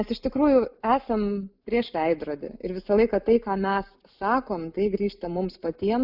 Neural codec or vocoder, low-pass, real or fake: none; 5.4 kHz; real